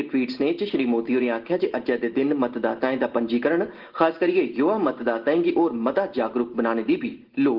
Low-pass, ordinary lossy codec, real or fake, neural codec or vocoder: 5.4 kHz; Opus, 16 kbps; real; none